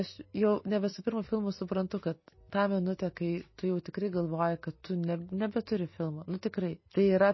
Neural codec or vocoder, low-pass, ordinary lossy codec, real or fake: none; 7.2 kHz; MP3, 24 kbps; real